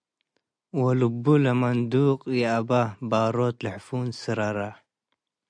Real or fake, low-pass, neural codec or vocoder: real; 9.9 kHz; none